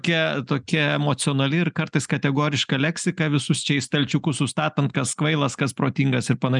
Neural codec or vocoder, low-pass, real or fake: none; 10.8 kHz; real